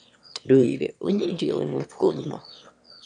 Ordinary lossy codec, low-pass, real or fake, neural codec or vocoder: MP3, 96 kbps; 9.9 kHz; fake; autoencoder, 22.05 kHz, a latent of 192 numbers a frame, VITS, trained on one speaker